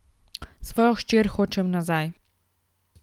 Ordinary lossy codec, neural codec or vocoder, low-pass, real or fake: Opus, 32 kbps; none; 19.8 kHz; real